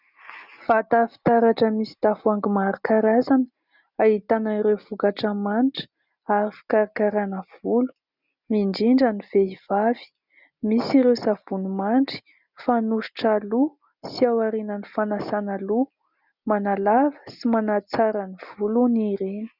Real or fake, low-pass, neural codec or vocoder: real; 5.4 kHz; none